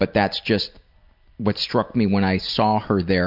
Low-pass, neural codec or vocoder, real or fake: 5.4 kHz; none; real